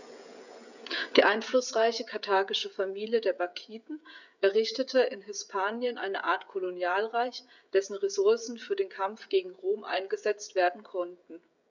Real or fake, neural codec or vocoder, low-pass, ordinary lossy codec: fake; codec, 16 kHz, 16 kbps, FreqCodec, smaller model; 7.2 kHz; none